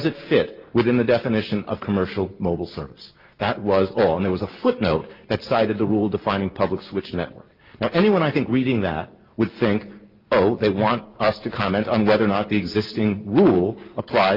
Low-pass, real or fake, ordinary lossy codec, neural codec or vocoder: 5.4 kHz; real; Opus, 32 kbps; none